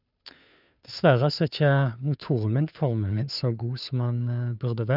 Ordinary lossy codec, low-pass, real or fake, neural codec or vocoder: none; 5.4 kHz; fake; codec, 16 kHz, 2 kbps, FunCodec, trained on Chinese and English, 25 frames a second